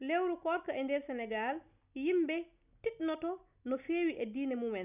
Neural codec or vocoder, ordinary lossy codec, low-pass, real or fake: none; none; 3.6 kHz; real